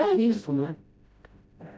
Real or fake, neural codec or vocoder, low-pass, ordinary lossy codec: fake; codec, 16 kHz, 0.5 kbps, FreqCodec, smaller model; none; none